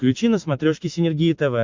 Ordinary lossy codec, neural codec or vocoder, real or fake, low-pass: MP3, 64 kbps; none; real; 7.2 kHz